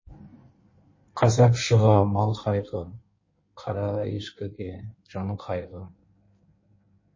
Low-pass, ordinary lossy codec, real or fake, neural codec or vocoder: 7.2 kHz; MP3, 32 kbps; fake; codec, 16 kHz in and 24 kHz out, 1.1 kbps, FireRedTTS-2 codec